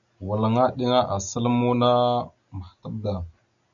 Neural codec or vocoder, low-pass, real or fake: none; 7.2 kHz; real